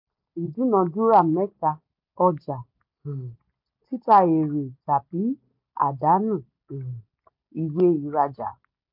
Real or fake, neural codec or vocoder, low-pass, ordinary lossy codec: real; none; 5.4 kHz; MP3, 48 kbps